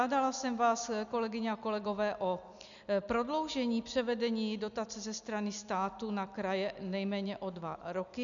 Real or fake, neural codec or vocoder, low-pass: real; none; 7.2 kHz